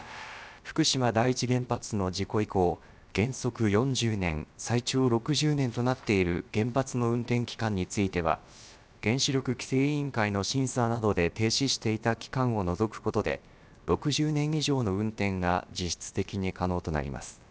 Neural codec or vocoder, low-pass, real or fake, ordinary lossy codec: codec, 16 kHz, about 1 kbps, DyCAST, with the encoder's durations; none; fake; none